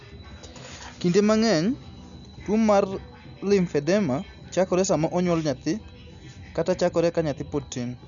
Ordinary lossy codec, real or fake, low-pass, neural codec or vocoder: none; real; 7.2 kHz; none